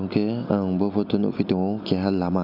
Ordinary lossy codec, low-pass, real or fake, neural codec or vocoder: none; 5.4 kHz; real; none